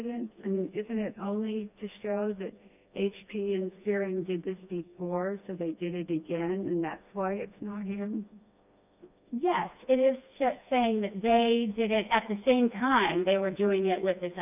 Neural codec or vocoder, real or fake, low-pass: codec, 16 kHz, 2 kbps, FreqCodec, smaller model; fake; 3.6 kHz